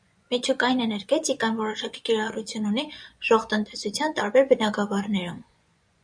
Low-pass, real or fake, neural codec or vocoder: 9.9 kHz; fake; vocoder, 24 kHz, 100 mel bands, Vocos